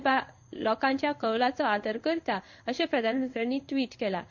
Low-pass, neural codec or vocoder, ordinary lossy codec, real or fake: 7.2 kHz; codec, 16 kHz in and 24 kHz out, 1 kbps, XY-Tokenizer; none; fake